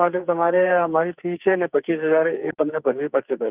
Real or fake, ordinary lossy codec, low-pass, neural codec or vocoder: fake; Opus, 24 kbps; 3.6 kHz; codec, 44.1 kHz, 2.6 kbps, SNAC